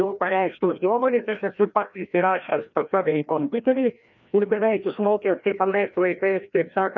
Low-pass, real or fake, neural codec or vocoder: 7.2 kHz; fake; codec, 16 kHz, 1 kbps, FreqCodec, larger model